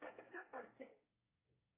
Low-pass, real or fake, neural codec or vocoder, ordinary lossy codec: 3.6 kHz; fake; codec, 24 kHz, 1 kbps, SNAC; AAC, 16 kbps